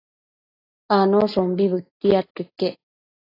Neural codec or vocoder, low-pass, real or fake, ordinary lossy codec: none; 5.4 kHz; real; AAC, 32 kbps